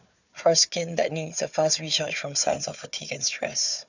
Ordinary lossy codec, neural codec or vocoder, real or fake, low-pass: none; codec, 16 kHz, 4 kbps, FunCodec, trained on Chinese and English, 50 frames a second; fake; 7.2 kHz